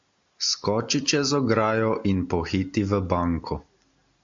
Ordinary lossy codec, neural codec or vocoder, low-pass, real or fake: AAC, 64 kbps; none; 7.2 kHz; real